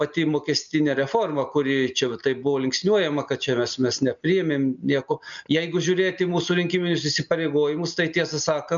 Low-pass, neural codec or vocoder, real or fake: 7.2 kHz; none; real